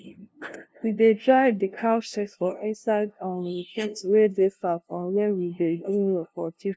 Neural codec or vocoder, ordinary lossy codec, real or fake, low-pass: codec, 16 kHz, 0.5 kbps, FunCodec, trained on LibriTTS, 25 frames a second; none; fake; none